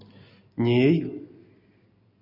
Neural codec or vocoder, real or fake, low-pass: none; real; 5.4 kHz